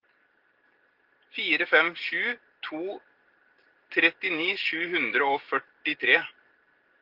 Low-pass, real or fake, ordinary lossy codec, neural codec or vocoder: 5.4 kHz; real; Opus, 16 kbps; none